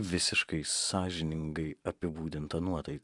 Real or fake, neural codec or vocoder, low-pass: fake; vocoder, 44.1 kHz, 128 mel bands every 512 samples, BigVGAN v2; 10.8 kHz